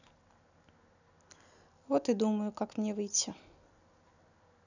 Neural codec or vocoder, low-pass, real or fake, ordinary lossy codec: none; 7.2 kHz; real; none